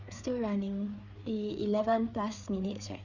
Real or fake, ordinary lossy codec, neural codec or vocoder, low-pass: fake; none; codec, 16 kHz, 4 kbps, FreqCodec, larger model; 7.2 kHz